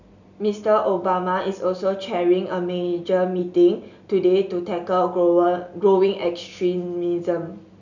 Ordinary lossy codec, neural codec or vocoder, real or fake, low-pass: none; none; real; 7.2 kHz